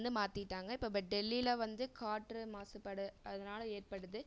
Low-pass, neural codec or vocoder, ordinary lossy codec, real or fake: none; none; none; real